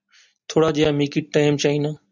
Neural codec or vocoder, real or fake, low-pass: none; real; 7.2 kHz